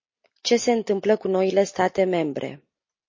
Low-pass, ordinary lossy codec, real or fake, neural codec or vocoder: 7.2 kHz; MP3, 32 kbps; real; none